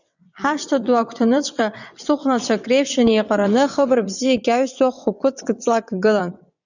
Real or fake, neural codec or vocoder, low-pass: fake; vocoder, 22.05 kHz, 80 mel bands, WaveNeXt; 7.2 kHz